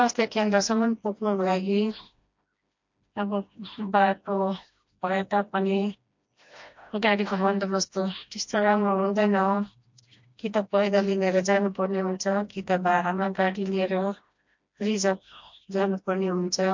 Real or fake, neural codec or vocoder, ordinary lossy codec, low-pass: fake; codec, 16 kHz, 1 kbps, FreqCodec, smaller model; MP3, 48 kbps; 7.2 kHz